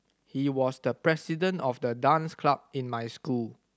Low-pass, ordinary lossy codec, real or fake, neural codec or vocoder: none; none; real; none